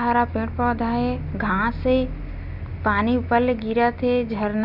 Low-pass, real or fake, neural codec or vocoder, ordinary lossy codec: 5.4 kHz; real; none; none